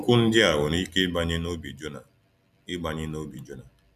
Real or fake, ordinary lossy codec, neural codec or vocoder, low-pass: real; none; none; 14.4 kHz